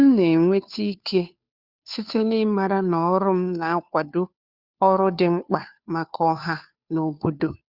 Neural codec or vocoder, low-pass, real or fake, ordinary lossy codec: codec, 16 kHz, 2 kbps, FunCodec, trained on Chinese and English, 25 frames a second; 5.4 kHz; fake; Opus, 64 kbps